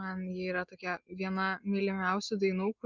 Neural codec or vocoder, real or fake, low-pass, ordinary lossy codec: none; real; 7.2 kHz; Opus, 32 kbps